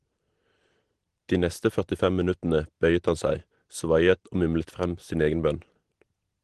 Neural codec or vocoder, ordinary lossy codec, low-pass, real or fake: none; Opus, 16 kbps; 10.8 kHz; real